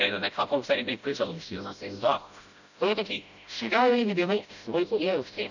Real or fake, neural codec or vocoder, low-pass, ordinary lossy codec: fake; codec, 16 kHz, 0.5 kbps, FreqCodec, smaller model; 7.2 kHz; none